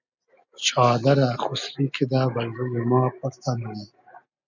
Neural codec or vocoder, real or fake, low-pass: none; real; 7.2 kHz